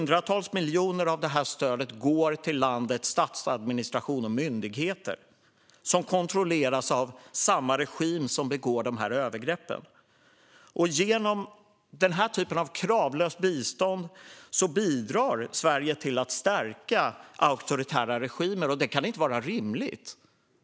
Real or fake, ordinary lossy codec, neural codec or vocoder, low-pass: real; none; none; none